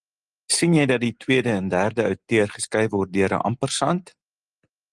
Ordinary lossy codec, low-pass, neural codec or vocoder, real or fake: Opus, 24 kbps; 10.8 kHz; none; real